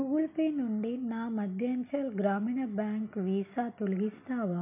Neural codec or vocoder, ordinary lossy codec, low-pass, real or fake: none; none; 3.6 kHz; real